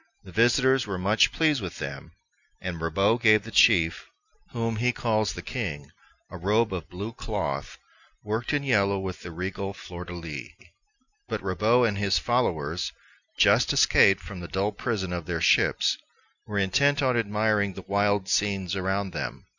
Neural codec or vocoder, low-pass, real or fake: none; 7.2 kHz; real